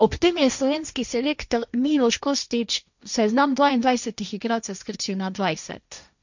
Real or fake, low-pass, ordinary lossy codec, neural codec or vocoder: fake; 7.2 kHz; none; codec, 16 kHz, 1.1 kbps, Voila-Tokenizer